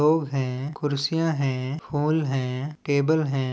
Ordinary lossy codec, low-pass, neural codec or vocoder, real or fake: none; none; none; real